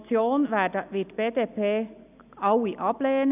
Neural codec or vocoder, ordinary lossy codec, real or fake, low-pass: none; none; real; 3.6 kHz